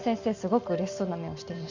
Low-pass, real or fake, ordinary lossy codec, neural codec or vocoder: 7.2 kHz; real; none; none